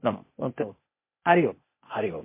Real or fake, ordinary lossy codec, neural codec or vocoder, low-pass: fake; MP3, 24 kbps; codec, 16 kHz, 0.8 kbps, ZipCodec; 3.6 kHz